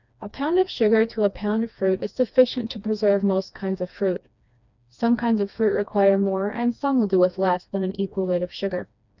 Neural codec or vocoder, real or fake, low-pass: codec, 16 kHz, 2 kbps, FreqCodec, smaller model; fake; 7.2 kHz